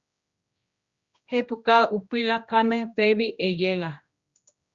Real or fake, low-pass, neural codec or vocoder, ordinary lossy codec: fake; 7.2 kHz; codec, 16 kHz, 1 kbps, X-Codec, HuBERT features, trained on general audio; Opus, 64 kbps